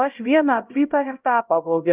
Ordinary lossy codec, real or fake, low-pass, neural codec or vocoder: Opus, 32 kbps; fake; 3.6 kHz; codec, 16 kHz, 0.5 kbps, X-Codec, HuBERT features, trained on LibriSpeech